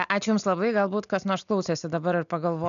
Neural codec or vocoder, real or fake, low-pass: none; real; 7.2 kHz